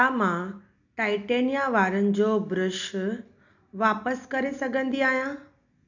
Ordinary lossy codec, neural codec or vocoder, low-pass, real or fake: none; none; 7.2 kHz; real